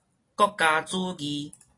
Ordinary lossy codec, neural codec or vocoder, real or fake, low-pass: AAC, 32 kbps; none; real; 10.8 kHz